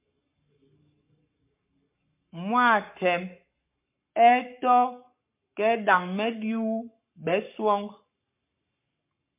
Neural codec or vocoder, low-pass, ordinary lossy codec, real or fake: codec, 44.1 kHz, 7.8 kbps, Pupu-Codec; 3.6 kHz; MP3, 32 kbps; fake